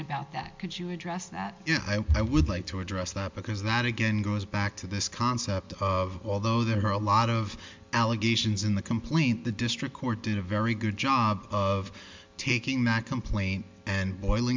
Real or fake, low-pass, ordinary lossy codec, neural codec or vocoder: real; 7.2 kHz; MP3, 64 kbps; none